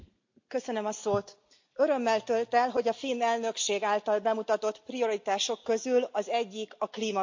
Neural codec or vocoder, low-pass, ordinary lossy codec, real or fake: none; 7.2 kHz; MP3, 48 kbps; real